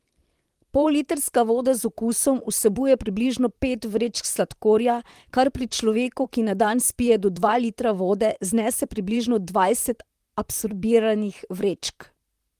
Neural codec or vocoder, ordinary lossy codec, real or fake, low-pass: vocoder, 44.1 kHz, 128 mel bands, Pupu-Vocoder; Opus, 24 kbps; fake; 14.4 kHz